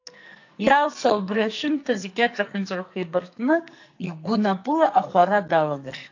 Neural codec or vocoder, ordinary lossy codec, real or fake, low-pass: codec, 44.1 kHz, 2.6 kbps, SNAC; AAC, 48 kbps; fake; 7.2 kHz